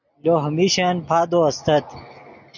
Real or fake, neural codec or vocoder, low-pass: real; none; 7.2 kHz